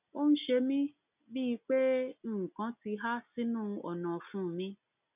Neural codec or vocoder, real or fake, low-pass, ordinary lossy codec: none; real; 3.6 kHz; none